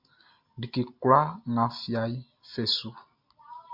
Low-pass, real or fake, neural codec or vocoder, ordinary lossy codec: 5.4 kHz; real; none; MP3, 48 kbps